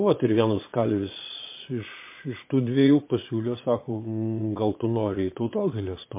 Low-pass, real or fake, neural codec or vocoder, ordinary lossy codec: 3.6 kHz; fake; vocoder, 22.05 kHz, 80 mel bands, Vocos; MP3, 24 kbps